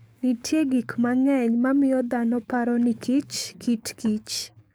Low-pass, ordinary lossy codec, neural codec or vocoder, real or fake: none; none; codec, 44.1 kHz, 7.8 kbps, DAC; fake